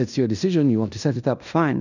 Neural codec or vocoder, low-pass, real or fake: codec, 16 kHz in and 24 kHz out, 0.9 kbps, LongCat-Audio-Codec, fine tuned four codebook decoder; 7.2 kHz; fake